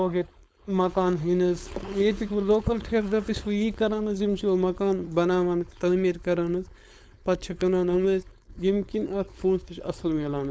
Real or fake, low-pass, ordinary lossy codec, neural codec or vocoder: fake; none; none; codec, 16 kHz, 4.8 kbps, FACodec